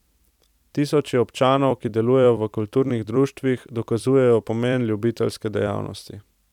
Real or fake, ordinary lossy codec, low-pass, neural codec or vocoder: fake; none; 19.8 kHz; vocoder, 44.1 kHz, 128 mel bands every 256 samples, BigVGAN v2